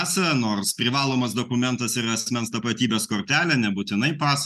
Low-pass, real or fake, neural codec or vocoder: 14.4 kHz; real; none